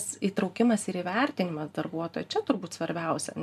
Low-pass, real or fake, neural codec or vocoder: 14.4 kHz; fake; vocoder, 44.1 kHz, 128 mel bands every 512 samples, BigVGAN v2